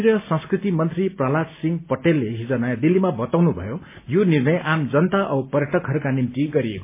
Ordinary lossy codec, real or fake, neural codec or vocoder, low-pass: MP3, 24 kbps; real; none; 3.6 kHz